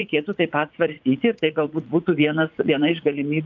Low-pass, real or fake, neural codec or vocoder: 7.2 kHz; fake; vocoder, 44.1 kHz, 80 mel bands, Vocos